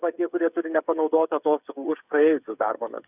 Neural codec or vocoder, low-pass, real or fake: codec, 16 kHz, 8 kbps, FreqCodec, smaller model; 3.6 kHz; fake